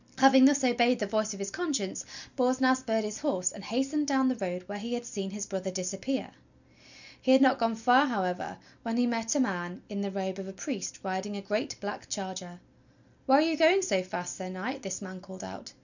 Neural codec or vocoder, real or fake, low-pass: none; real; 7.2 kHz